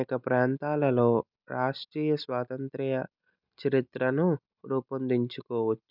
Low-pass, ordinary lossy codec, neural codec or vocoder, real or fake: 5.4 kHz; none; none; real